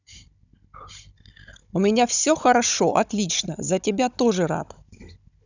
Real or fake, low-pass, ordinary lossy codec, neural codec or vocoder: fake; 7.2 kHz; none; codec, 16 kHz, 16 kbps, FunCodec, trained on Chinese and English, 50 frames a second